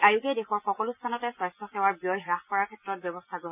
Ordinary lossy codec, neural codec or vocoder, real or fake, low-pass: none; none; real; 3.6 kHz